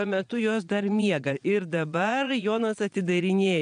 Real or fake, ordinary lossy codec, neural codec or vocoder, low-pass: fake; MP3, 96 kbps; vocoder, 22.05 kHz, 80 mel bands, Vocos; 9.9 kHz